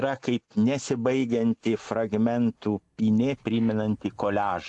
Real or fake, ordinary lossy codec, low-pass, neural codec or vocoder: real; AAC, 64 kbps; 10.8 kHz; none